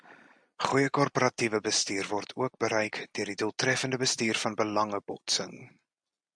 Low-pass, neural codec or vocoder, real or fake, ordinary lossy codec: 9.9 kHz; none; real; MP3, 96 kbps